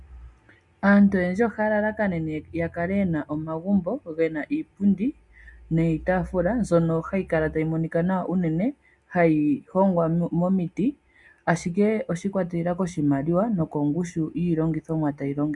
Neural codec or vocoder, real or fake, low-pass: none; real; 10.8 kHz